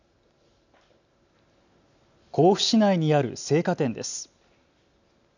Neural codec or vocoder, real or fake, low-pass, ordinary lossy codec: none; real; 7.2 kHz; none